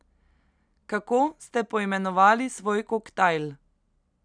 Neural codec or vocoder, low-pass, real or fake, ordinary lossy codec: none; 9.9 kHz; real; none